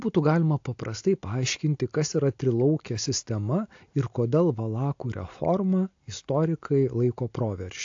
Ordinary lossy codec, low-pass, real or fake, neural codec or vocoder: AAC, 48 kbps; 7.2 kHz; real; none